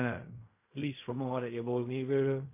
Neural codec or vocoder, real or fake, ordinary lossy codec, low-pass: codec, 16 kHz in and 24 kHz out, 0.4 kbps, LongCat-Audio-Codec, fine tuned four codebook decoder; fake; MP3, 24 kbps; 3.6 kHz